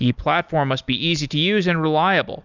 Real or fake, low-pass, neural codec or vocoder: real; 7.2 kHz; none